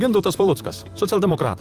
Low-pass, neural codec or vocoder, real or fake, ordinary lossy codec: 14.4 kHz; vocoder, 44.1 kHz, 128 mel bands, Pupu-Vocoder; fake; Opus, 32 kbps